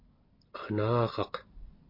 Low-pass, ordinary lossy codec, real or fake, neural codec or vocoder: 5.4 kHz; MP3, 32 kbps; real; none